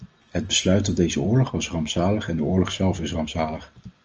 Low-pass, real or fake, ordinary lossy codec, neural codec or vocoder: 7.2 kHz; real; Opus, 24 kbps; none